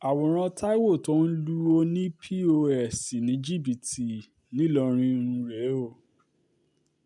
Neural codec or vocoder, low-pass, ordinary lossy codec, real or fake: none; 10.8 kHz; none; real